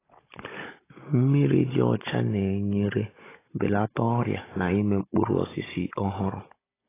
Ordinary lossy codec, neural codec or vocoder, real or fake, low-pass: AAC, 16 kbps; codec, 16 kHz, 16 kbps, FunCodec, trained on Chinese and English, 50 frames a second; fake; 3.6 kHz